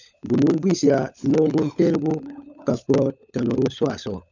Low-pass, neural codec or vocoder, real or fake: 7.2 kHz; codec, 16 kHz, 16 kbps, FunCodec, trained on LibriTTS, 50 frames a second; fake